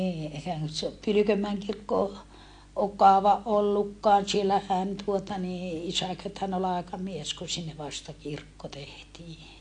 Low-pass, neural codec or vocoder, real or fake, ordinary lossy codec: 9.9 kHz; none; real; AAC, 48 kbps